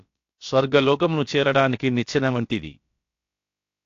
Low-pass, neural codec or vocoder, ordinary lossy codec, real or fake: 7.2 kHz; codec, 16 kHz, about 1 kbps, DyCAST, with the encoder's durations; AAC, 48 kbps; fake